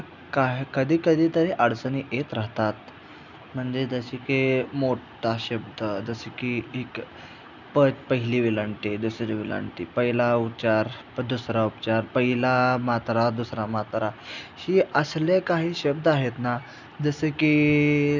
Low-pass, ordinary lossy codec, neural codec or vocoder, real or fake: 7.2 kHz; none; none; real